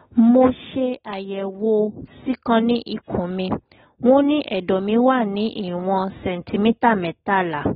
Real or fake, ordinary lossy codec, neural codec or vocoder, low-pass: real; AAC, 16 kbps; none; 7.2 kHz